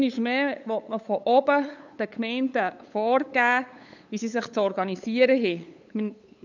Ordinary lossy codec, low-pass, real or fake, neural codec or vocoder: none; 7.2 kHz; fake; codec, 16 kHz, 8 kbps, FunCodec, trained on LibriTTS, 25 frames a second